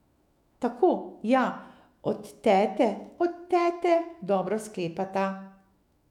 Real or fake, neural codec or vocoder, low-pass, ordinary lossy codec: fake; autoencoder, 48 kHz, 128 numbers a frame, DAC-VAE, trained on Japanese speech; 19.8 kHz; none